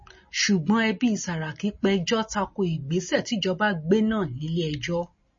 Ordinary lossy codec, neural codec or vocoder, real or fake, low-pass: MP3, 32 kbps; none; real; 7.2 kHz